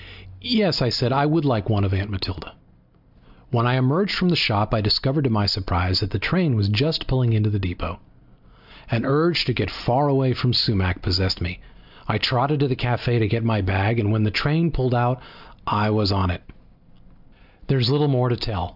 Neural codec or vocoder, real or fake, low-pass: none; real; 5.4 kHz